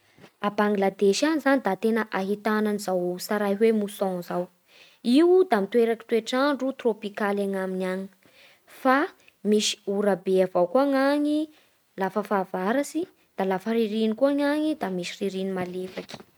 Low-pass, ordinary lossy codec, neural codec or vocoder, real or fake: none; none; none; real